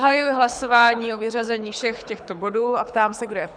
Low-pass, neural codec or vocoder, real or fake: 9.9 kHz; codec, 24 kHz, 6 kbps, HILCodec; fake